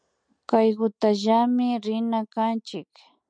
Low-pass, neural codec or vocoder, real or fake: 9.9 kHz; none; real